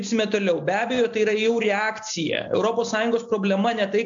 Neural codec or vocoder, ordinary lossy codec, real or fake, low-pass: none; AAC, 64 kbps; real; 7.2 kHz